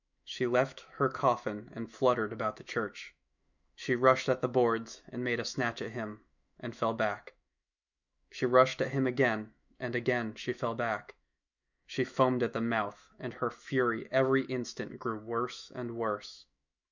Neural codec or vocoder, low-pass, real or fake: autoencoder, 48 kHz, 128 numbers a frame, DAC-VAE, trained on Japanese speech; 7.2 kHz; fake